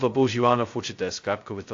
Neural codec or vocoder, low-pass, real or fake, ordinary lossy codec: codec, 16 kHz, 0.2 kbps, FocalCodec; 7.2 kHz; fake; AAC, 48 kbps